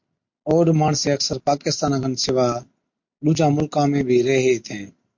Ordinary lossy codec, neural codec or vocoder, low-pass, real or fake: MP3, 48 kbps; none; 7.2 kHz; real